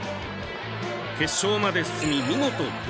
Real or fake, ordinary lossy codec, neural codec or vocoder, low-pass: real; none; none; none